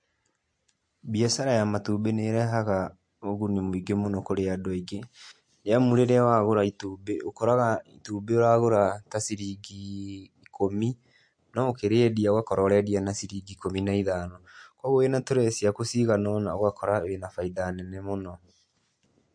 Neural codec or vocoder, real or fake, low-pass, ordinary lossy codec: none; real; 9.9 kHz; MP3, 48 kbps